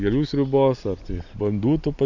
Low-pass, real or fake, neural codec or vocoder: 7.2 kHz; real; none